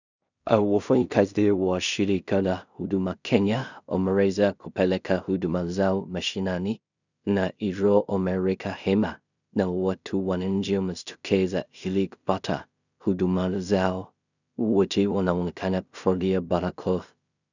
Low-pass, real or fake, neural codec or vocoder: 7.2 kHz; fake; codec, 16 kHz in and 24 kHz out, 0.4 kbps, LongCat-Audio-Codec, two codebook decoder